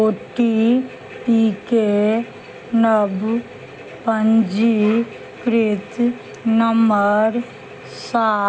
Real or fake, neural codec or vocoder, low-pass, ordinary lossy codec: real; none; none; none